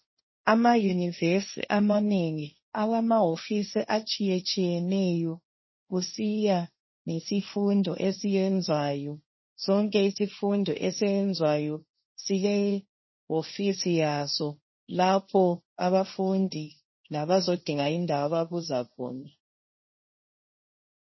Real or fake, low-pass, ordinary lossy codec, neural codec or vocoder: fake; 7.2 kHz; MP3, 24 kbps; codec, 16 kHz, 1.1 kbps, Voila-Tokenizer